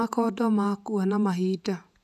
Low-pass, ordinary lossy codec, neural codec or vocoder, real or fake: 14.4 kHz; none; vocoder, 48 kHz, 128 mel bands, Vocos; fake